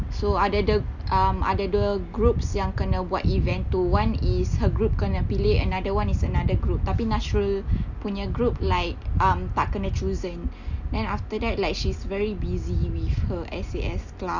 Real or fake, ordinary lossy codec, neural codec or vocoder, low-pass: real; none; none; 7.2 kHz